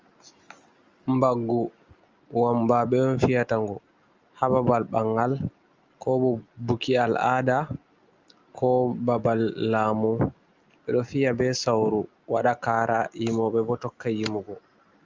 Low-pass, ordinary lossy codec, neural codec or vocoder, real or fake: 7.2 kHz; Opus, 32 kbps; none; real